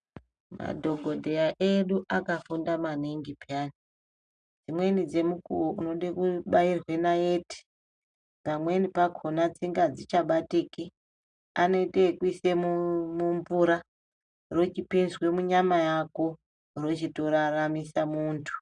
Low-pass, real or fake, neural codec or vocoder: 9.9 kHz; real; none